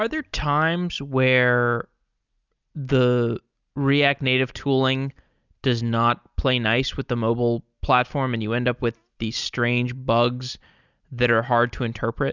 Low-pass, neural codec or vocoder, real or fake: 7.2 kHz; none; real